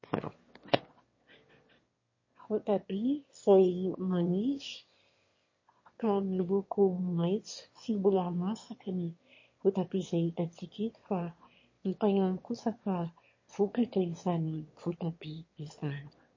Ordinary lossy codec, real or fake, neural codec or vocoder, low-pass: MP3, 32 kbps; fake; autoencoder, 22.05 kHz, a latent of 192 numbers a frame, VITS, trained on one speaker; 7.2 kHz